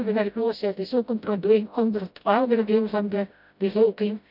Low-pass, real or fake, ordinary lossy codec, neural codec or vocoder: 5.4 kHz; fake; none; codec, 16 kHz, 0.5 kbps, FreqCodec, smaller model